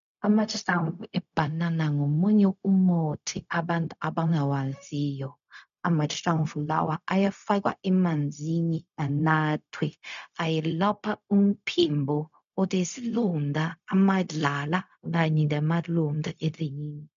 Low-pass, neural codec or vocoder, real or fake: 7.2 kHz; codec, 16 kHz, 0.4 kbps, LongCat-Audio-Codec; fake